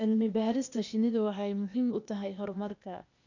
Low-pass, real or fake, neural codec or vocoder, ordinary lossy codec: 7.2 kHz; fake; codec, 16 kHz, 0.8 kbps, ZipCodec; none